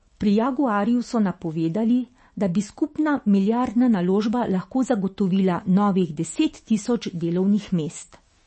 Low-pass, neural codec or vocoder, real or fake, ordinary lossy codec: 10.8 kHz; autoencoder, 48 kHz, 128 numbers a frame, DAC-VAE, trained on Japanese speech; fake; MP3, 32 kbps